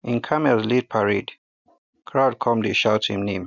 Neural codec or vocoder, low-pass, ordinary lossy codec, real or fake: none; 7.2 kHz; none; real